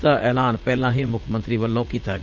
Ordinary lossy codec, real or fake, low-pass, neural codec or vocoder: Opus, 32 kbps; fake; 7.2 kHz; vocoder, 44.1 kHz, 80 mel bands, Vocos